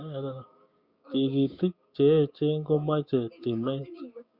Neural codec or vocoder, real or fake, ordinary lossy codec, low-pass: codec, 16 kHz, 6 kbps, DAC; fake; Opus, 64 kbps; 5.4 kHz